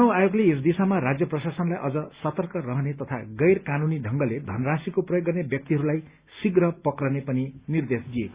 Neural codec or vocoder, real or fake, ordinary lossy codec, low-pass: none; real; Opus, 64 kbps; 3.6 kHz